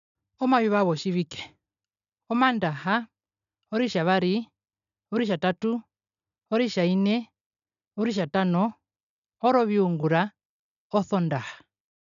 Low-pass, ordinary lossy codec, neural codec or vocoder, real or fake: 7.2 kHz; none; none; real